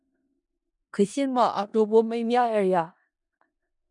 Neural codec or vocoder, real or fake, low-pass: codec, 16 kHz in and 24 kHz out, 0.4 kbps, LongCat-Audio-Codec, four codebook decoder; fake; 10.8 kHz